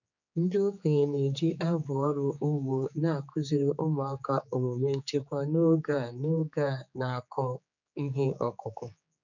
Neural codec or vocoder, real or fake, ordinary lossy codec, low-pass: codec, 16 kHz, 4 kbps, X-Codec, HuBERT features, trained on general audio; fake; none; 7.2 kHz